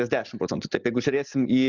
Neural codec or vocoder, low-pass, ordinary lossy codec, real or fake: none; 7.2 kHz; Opus, 64 kbps; real